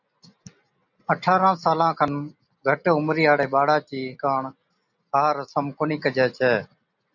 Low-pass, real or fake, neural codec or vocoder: 7.2 kHz; real; none